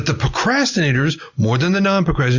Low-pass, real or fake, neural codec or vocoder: 7.2 kHz; real; none